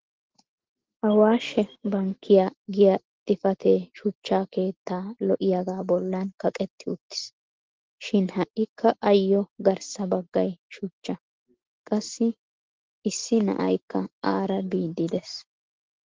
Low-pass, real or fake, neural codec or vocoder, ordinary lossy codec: 7.2 kHz; real; none; Opus, 16 kbps